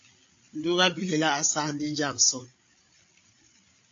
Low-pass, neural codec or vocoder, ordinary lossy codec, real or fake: 7.2 kHz; codec, 16 kHz, 8 kbps, FreqCodec, larger model; AAC, 48 kbps; fake